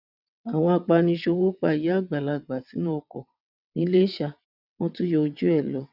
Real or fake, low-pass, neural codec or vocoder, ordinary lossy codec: fake; 5.4 kHz; vocoder, 22.05 kHz, 80 mel bands, WaveNeXt; none